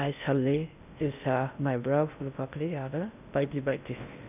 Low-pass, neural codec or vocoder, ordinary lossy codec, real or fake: 3.6 kHz; codec, 16 kHz in and 24 kHz out, 0.6 kbps, FocalCodec, streaming, 4096 codes; none; fake